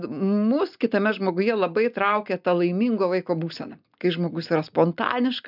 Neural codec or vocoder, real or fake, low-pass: none; real; 5.4 kHz